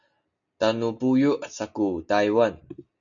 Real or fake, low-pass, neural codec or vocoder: real; 7.2 kHz; none